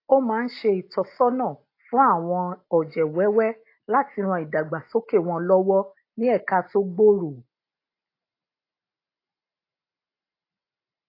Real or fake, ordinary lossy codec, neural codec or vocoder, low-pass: real; AAC, 32 kbps; none; 5.4 kHz